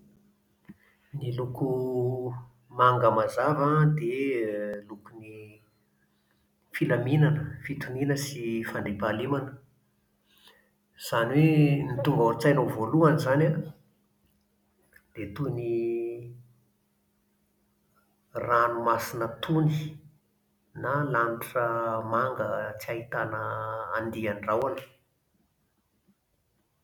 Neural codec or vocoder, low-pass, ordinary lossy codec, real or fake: none; 19.8 kHz; none; real